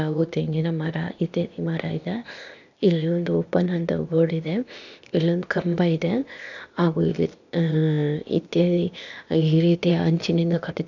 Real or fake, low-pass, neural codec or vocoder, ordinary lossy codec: fake; 7.2 kHz; codec, 16 kHz, 0.8 kbps, ZipCodec; none